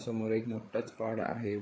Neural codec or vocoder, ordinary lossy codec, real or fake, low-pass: codec, 16 kHz, 8 kbps, FreqCodec, larger model; none; fake; none